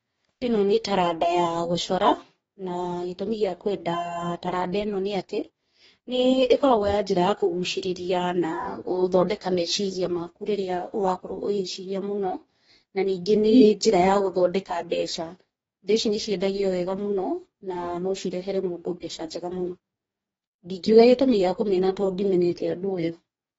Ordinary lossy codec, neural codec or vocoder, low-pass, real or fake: AAC, 24 kbps; codec, 44.1 kHz, 2.6 kbps, DAC; 19.8 kHz; fake